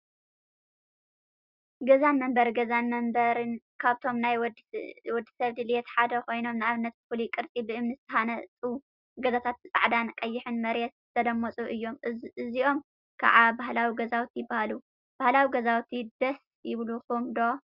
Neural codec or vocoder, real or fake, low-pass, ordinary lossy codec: none; real; 5.4 kHz; Opus, 64 kbps